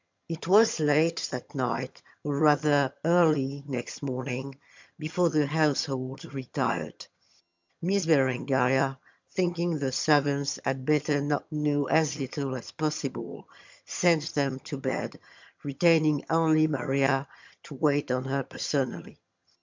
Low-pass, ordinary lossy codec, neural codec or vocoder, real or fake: 7.2 kHz; MP3, 64 kbps; vocoder, 22.05 kHz, 80 mel bands, HiFi-GAN; fake